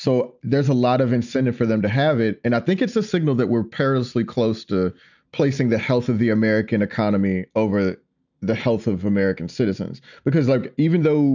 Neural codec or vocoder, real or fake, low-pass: none; real; 7.2 kHz